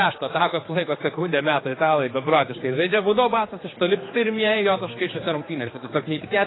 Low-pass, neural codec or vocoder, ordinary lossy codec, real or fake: 7.2 kHz; codec, 16 kHz, 4 kbps, X-Codec, HuBERT features, trained on general audio; AAC, 16 kbps; fake